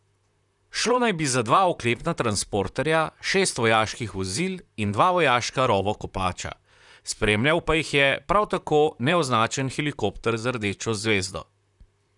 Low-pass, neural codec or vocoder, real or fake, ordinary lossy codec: 10.8 kHz; vocoder, 44.1 kHz, 128 mel bands, Pupu-Vocoder; fake; none